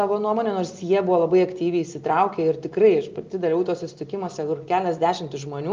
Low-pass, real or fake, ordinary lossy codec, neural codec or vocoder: 7.2 kHz; real; Opus, 32 kbps; none